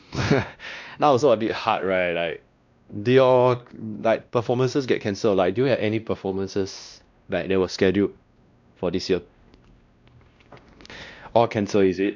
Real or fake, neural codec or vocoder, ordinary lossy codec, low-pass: fake; codec, 16 kHz, 1 kbps, X-Codec, WavLM features, trained on Multilingual LibriSpeech; none; 7.2 kHz